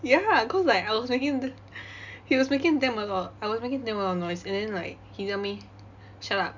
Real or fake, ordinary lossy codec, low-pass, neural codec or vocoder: real; none; 7.2 kHz; none